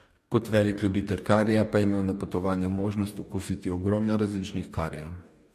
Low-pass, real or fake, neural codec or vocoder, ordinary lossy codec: 14.4 kHz; fake; codec, 44.1 kHz, 2.6 kbps, DAC; MP3, 64 kbps